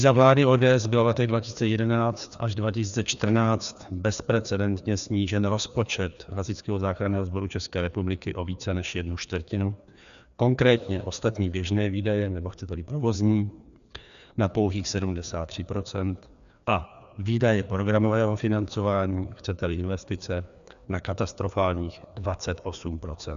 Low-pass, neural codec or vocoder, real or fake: 7.2 kHz; codec, 16 kHz, 2 kbps, FreqCodec, larger model; fake